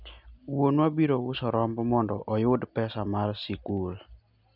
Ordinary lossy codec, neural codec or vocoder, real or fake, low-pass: none; none; real; 5.4 kHz